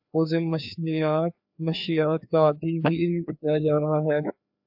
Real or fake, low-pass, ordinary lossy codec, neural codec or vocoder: fake; 5.4 kHz; AAC, 48 kbps; codec, 16 kHz, 2 kbps, FreqCodec, larger model